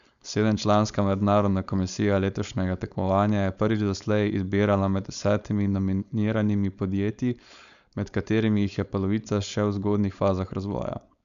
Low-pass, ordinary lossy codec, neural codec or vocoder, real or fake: 7.2 kHz; none; codec, 16 kHz, 4.8 kbps, FACodec; fake